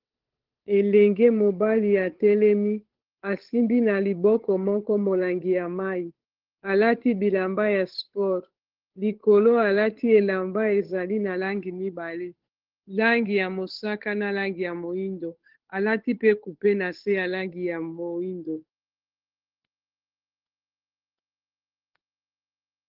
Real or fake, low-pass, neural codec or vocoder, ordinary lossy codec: fake; 5.4 kHz; codec, 16 kHz, 8 kbps, FunCodec, trained on Chinese and English, 25 frames a second; Opus, 16 kbps